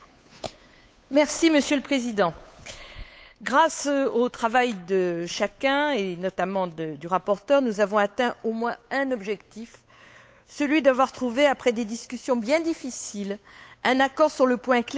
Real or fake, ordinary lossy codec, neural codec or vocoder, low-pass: fake; none; codec, 16 kHz, 8 kbps, FunCodec, trained on Chinese and English, 25 frames a second; none